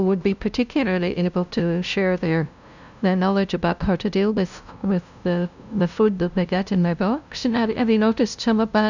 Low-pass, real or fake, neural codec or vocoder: 7.2 kHz; fake; codec, 16 kHz, 0.5 kbps, FunCodec, trained on LibriTTS, 25 frames a second